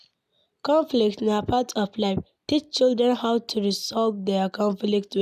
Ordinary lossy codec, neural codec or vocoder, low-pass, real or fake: none; none; 14.4 kHz; real